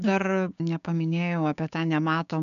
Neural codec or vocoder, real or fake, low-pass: codec, 16 kHz, 6 kbps, DAC; fake; 7.2 kHz